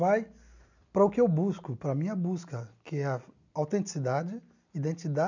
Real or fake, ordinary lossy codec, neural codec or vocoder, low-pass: real; none; none; 7.2 kHz